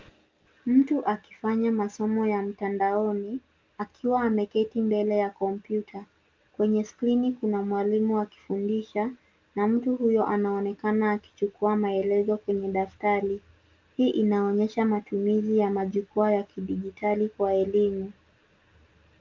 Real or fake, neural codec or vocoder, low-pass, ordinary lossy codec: real; none; 7.2 kHz; Opus, 24 kbps